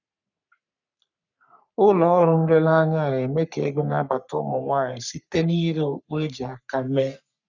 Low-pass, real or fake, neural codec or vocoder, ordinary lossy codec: 7.2 kHz; fake; codec, 44.1 kHz, 3.4 kbps, Pupu-Codec; none